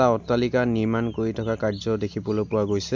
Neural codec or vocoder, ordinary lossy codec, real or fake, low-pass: none; MP3, 64 kbps; real; 7.2 kHz